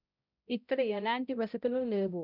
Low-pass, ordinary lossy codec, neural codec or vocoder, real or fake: 5.4 kHz; none; codec, 16 kHz, 0.5 kbps, X-Codec, HuBERT features, trained on balanced general audio; fake